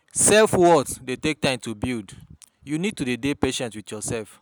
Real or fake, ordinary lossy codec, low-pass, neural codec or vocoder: real; none; none; none